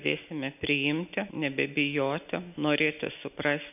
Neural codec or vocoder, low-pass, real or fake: none; 3.6 kHz; real